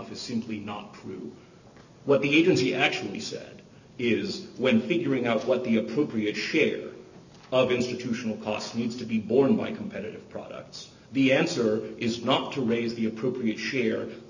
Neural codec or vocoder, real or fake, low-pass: none; real; 7.2 kHz